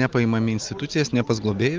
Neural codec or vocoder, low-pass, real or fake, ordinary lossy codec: none; 7.2 kHz; real; Opus, 24 kbps